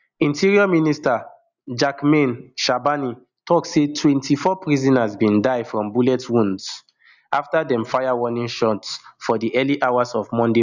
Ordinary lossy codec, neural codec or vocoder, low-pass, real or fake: none; none; 7.2 kHz; real